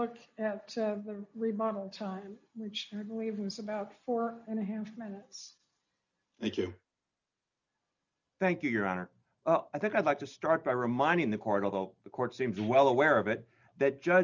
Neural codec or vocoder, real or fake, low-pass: none; real; 7.2 kHz